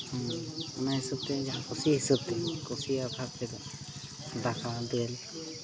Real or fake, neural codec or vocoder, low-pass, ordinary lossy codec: real; none; none; none